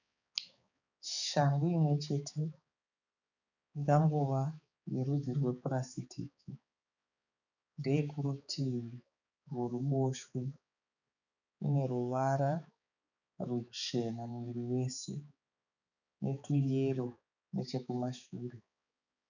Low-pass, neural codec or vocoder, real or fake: 7.2 kHz; codec, 16 kHz, 4 kbps, X-Codec, HuBERT features, trained on balanced general audio; fake